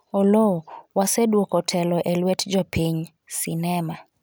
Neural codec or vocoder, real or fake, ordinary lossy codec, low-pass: none; real; none; none